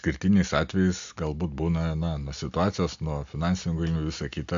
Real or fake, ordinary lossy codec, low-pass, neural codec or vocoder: real; AAC, 64 kbps; 7.2 kHz; none